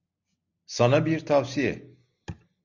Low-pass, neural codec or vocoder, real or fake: 7.2 kHz; none; real